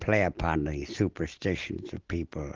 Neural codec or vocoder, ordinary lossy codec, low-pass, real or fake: none; Opus, 16 kbps; 7.2 kHz; real